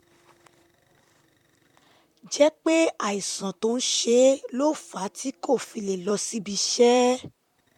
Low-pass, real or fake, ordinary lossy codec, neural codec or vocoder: 19.8 kHz; fake; MP3, 96 kbps; vocoder, 44.1 kHz, 128 mel bands, Pupu-Vocoder